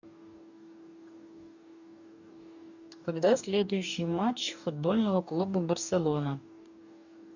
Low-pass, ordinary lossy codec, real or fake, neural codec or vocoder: 7.2 kHz; none; fake; codec, 44.1 kHz, 2.6 kbps, DAC